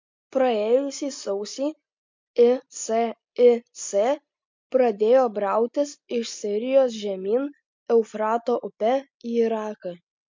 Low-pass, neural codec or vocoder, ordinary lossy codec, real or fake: 7.2 kHz; none; MP3, 48 kbps; real